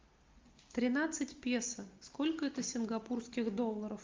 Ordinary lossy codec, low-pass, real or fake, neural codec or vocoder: Opus, 24 kbps; 7.2 kHz; real; none